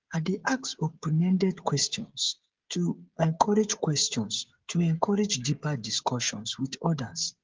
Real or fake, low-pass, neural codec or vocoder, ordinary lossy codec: fake; 7.2 kHz; codec, 16 kHz, 16 kbps, FreqCodec, smaller model; Opus, 16 kbps